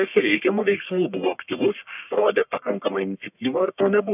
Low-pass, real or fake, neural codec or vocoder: 3.6 kHz; fake; codec, 44.1 kHz, 1.7 kbps, Pupu-Codec